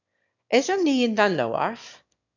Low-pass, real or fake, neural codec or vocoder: 7.2 kHz; fake; autoencoder, 22.05 kHz, a latent of 192 numbers a frame, VITS, trained on one speaker